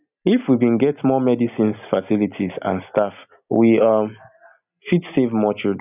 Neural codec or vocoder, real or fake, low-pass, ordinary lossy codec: none; real; 3.6 kHz; none